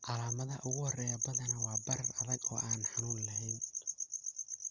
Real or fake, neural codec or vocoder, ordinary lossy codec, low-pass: real; none; Opus, 32 kbps; 7.2 kHz